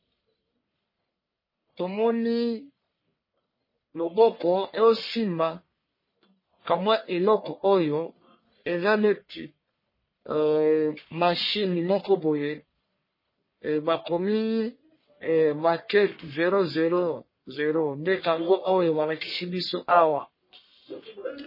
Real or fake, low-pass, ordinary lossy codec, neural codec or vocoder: fake; 5.4 kHz; MP3, 24 kbps; codec, 44.1 kHz, 1.7 kbps, Pupu-Codec